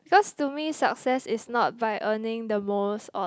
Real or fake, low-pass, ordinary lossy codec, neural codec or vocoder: real; none; none; none